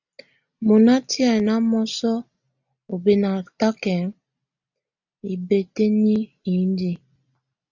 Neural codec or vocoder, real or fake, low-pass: none; real; 7.2 kHz